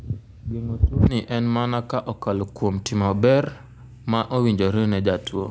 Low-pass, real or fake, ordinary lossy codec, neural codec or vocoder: none; real; none; none